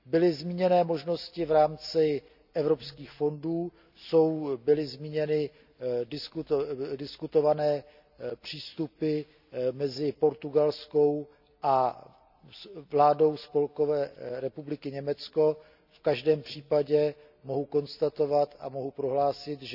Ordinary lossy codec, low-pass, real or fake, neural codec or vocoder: none; 5.4 kHz; real; none